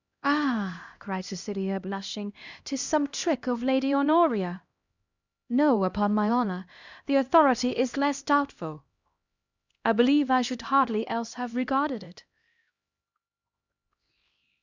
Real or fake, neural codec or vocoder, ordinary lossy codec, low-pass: fake; codec, 16 kHz, 1 kbps, X-Codec, HuBERT features, trained on LibriSpeech; Opus, 64 kbps; 7.2 kHz